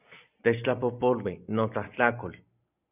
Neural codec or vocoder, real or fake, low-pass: none; real; 3.6 kHz